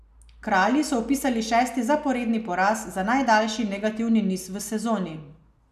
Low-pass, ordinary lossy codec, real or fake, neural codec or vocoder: 14.4 kHz; none; real; none